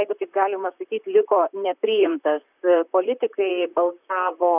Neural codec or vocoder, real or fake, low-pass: vocoder, 44.1 kHz, 128 mel bands, Pupu-Vocoder; fake; 3.6 kHz